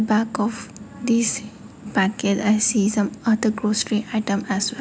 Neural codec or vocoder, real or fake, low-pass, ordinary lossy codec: none; real; none; none